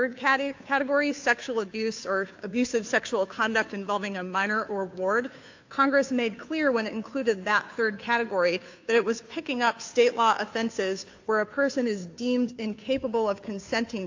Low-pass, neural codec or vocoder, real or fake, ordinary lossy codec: 7.2 kHz; codec, 16 kHz, 2 kbps, FunCodec, trained on Chinese and English, 25 frames a second; fake; AAC, 48 kbps